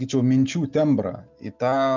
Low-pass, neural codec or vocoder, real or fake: 7.2 kHz; none; real